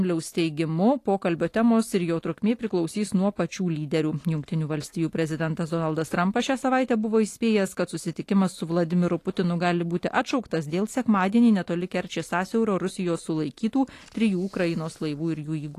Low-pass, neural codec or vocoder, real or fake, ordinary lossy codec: 14.4 kHz; none; real; AAC, 48 kbps